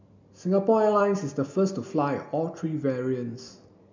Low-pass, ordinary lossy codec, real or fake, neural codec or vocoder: 7.2 kHz; MP3, 64 kbps; real; none